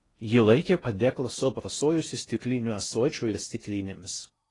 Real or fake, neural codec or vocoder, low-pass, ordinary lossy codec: fake; codec, 16 kHz in and 24 kHz out, 0.6 kbps, FocalCodec, streaming, 4096 codes; 10.8 kHz; AAC, 32 kbps